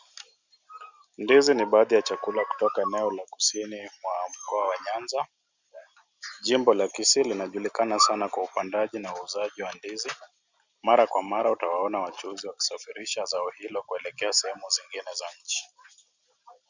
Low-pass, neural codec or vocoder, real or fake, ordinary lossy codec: 7.2 kHz; none; real; Opus, 64 kbps